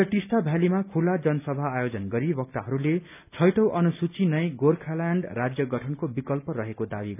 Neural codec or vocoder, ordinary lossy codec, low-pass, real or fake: none; none; 3.6 kHz; real